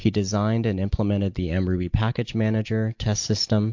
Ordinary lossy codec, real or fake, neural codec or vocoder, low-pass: MP3, 48 kbps; real; none; 7.2 kHz